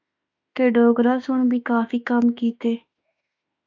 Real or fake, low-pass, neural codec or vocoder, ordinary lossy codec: fake; 7.2 kHz; autoencoder, 48 kHz, 32 numbers a frame, DAC-VAE, trained on Japanese speech; MP3, 64 kbps